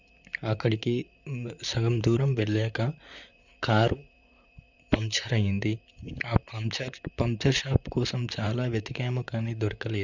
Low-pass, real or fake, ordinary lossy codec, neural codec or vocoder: 7.2 kHz; fake; none; vocoder, 44.1 kHz, 128 mel bands, Pupu-Vocoder